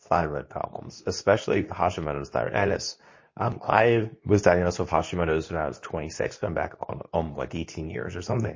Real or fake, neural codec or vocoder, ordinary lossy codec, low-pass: fake; codec, 24 kHz, 0.9 kbps, WavTokenizer, medium speech release version 2; MP3, 32 kbps; 7.2 kHz